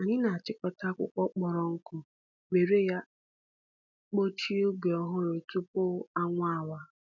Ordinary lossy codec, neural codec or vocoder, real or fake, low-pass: none; none; real; 7.2 kHz